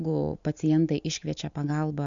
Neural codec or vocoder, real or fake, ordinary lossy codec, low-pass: none; real; MP3, 64 kbps; 7.2 kHz